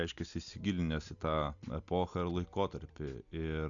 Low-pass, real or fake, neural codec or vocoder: 7.2 kHz; real; none